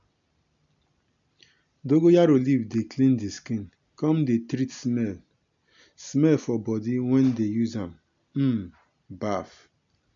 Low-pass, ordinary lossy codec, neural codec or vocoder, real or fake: 7.2 kHz; MP3, 64 kbps; none; real